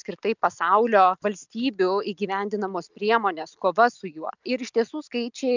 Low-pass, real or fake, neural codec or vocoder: 7.2 kHz; real; none